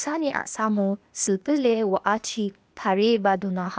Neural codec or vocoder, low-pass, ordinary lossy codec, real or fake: codec, 16 kHz, 0.8 kbps, ZipCodec; none; none; fake